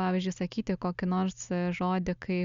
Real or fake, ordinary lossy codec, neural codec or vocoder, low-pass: real; Opus, 64 kbps; none; 7.2 kHz